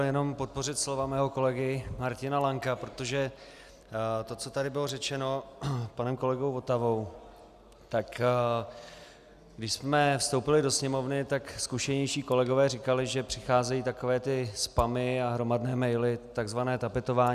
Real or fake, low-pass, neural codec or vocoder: real; 14.4 kHz; none